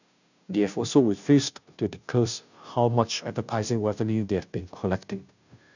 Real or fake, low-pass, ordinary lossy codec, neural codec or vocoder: fake; 7.2 kHz; none; codec, 16 kHz, 0.5 kbps, FunCodec, trained on Chinese and English, 25 frames a second